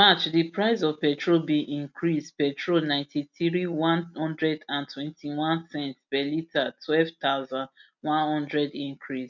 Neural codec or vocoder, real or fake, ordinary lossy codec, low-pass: none; real; none; 7.2 kHz